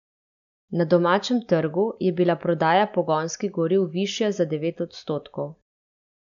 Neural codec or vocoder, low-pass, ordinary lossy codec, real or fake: none; 7.2 kHz; none; real